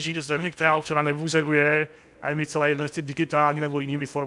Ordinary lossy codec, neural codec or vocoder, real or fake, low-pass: MP3, 96 kbps; codec, 16 kHz in and 24 kHz out, 0.8 kbps, FocalCodec, streaming, 65536 codes; fake; 10.8 kHz